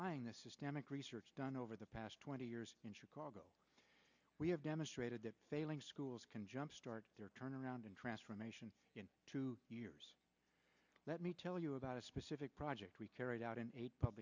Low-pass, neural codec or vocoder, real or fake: 7.2 kHz; none; real